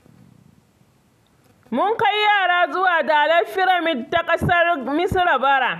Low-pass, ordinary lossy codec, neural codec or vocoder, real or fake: 14.4 kHz; none; none; real